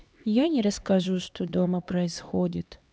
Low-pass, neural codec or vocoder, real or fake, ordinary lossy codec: none; codec, 16 kHz, 2 kbps, X-Codec, HuBERT features, trained on LibriSpeech; fake; none